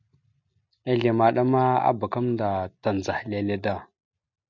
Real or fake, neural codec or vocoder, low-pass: real; none; 7.2 kHz